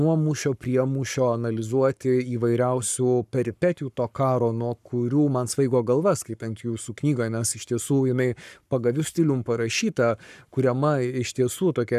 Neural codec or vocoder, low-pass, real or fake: codec, 44.1 kHz, 7.8 kbps, Pupu-Codec; 14.4 kHz; fake